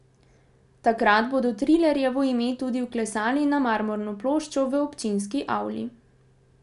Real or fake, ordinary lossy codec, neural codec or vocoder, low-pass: real; none; none; 10.8 kHz